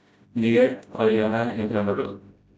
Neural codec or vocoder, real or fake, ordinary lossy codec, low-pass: codec, 16 kHz, 0.5 kbps, FreqCodec, smaller model; fake; none; none